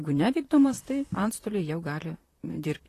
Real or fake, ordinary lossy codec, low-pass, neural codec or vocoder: fake; AAC, 48 kbps; 14.4 kHz; vocoder, 44.1 kHz, 128 mel bands, Pupu-Vocoder